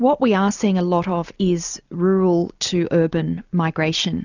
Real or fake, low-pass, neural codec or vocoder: real; 7.2 kHz; none